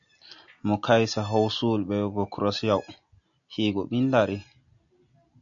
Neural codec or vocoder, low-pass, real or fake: none; 7.2 kHz; real